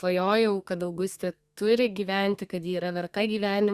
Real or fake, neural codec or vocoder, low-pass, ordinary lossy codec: fake; codec, 32 kHz, 1.9 kbps, SNAC; 14.4 kHz; Opus, 64 kbps